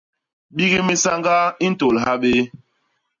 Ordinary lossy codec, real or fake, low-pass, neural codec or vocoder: MP3, 96 kbps; real; 7.2 kHz; none